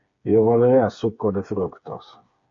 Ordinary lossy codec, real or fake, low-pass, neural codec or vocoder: MP3, 48 kbps; fake; 7.2 kHz; codec, 16 kHz, 4 kbps, FreqCodec, smaller model